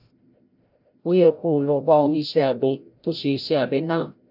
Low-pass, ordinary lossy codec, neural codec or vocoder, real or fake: 5.4 kHz; none; codec, 16 kHz, 0.5 kbps, FreqCodec, larger model; fake